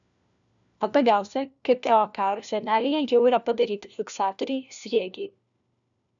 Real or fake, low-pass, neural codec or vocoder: fake; 7.2 kHz; codec, 16 kHz, 1 kbps, FunCodec, trained on LibriTTS, 50 frames a second